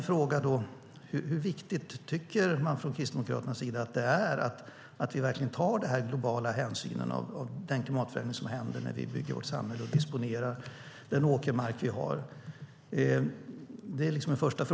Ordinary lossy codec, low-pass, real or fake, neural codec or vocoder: none; none; real; none